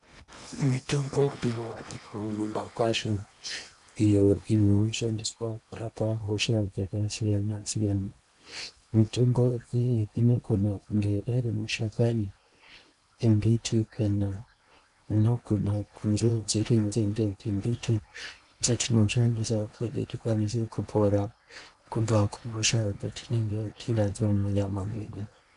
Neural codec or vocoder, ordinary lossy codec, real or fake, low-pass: codec, 16 kHz in and 24 kHz out, 0.8 kbps, FocalCodec, streaming, 65536 codes; MP3, 96 kbps; fake; 10.8 kHz